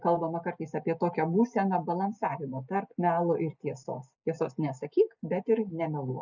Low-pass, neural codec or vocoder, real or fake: 7.2 kHz; none; real